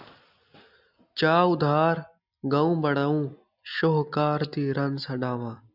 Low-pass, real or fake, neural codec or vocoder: 5.4 kHz; real; none